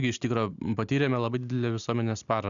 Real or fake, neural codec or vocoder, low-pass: real; none; 7.2 kHz